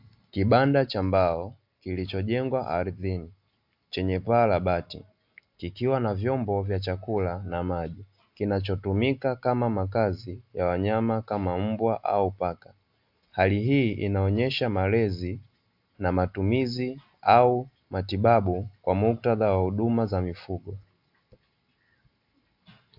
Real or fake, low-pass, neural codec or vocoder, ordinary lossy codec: real; 5.4 kHz; none; AAC, 48 kbps